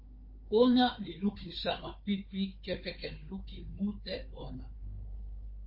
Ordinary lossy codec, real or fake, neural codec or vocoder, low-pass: MP3, 32 kbps; fake; codec, 16 kHz, 4 kbps, FunCodec, trained on Chinese and English, 50 frames a second; 5.4 kHz